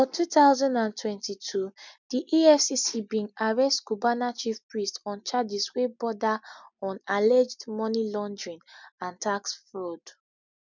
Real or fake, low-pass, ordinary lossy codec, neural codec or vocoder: real; 7.2 kHz; none; none